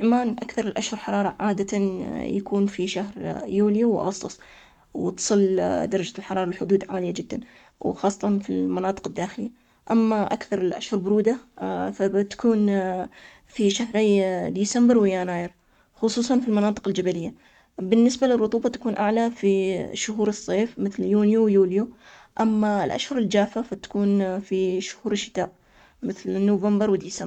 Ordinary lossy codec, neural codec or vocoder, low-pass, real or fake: none; codec, 44.1 kHz, 7.8 kbps, Pupu-Codec; 19.8 kHz; fake